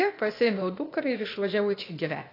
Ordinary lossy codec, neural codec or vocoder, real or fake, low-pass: AAC, 32 kbps; codec, 16 kHz, 0.8 kbps, ZipCodec; fake; 5.4 kHz